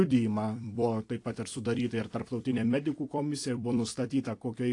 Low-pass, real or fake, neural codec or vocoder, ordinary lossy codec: 10.8 kHz; fake; vocoder, 44.1 kHz, 128 mel bands every 256 samples, BigVGAN v2; AAC, 48 kbps